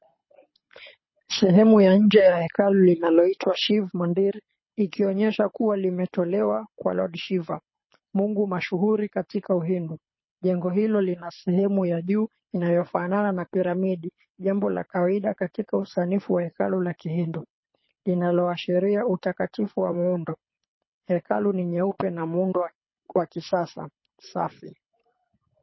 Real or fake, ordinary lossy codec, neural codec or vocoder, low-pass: fake; MP3, 24 kbps; codec, 24 kHz, 6 kbps, HILCodec; 7.2 kHz